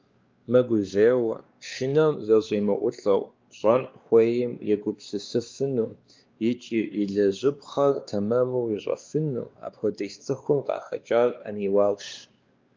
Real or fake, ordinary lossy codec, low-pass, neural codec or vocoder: fake; Opus, 24 kbps; 7.2 kHz; codec, 16 kHz, 2 kbps, X-Codec, WavLM features, trained on Multilingual LibriSpeech